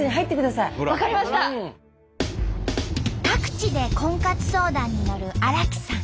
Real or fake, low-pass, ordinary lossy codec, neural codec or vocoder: real; none; none; none